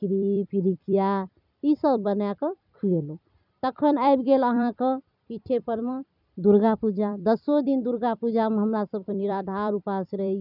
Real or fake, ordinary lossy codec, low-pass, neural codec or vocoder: fake; none; 5.4 kHz; vocoder, 44.1 kHz, 128 mel bands every 256 samples, BigVGAN v2